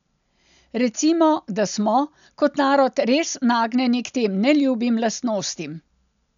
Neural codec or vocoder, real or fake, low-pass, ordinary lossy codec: none; real; 7.2 kHz; none